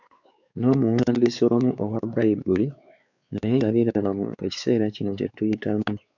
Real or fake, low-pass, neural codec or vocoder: fake; 7.2 kHz; codec, 16 kHz, 4 kbps, X-Codec, WavLM features, trained on Multilingual LibriSpeech